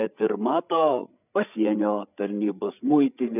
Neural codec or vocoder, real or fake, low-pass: codec, 16 kHz, 8 kbps, FreqCodec, larger model; fake; 3.6 kHz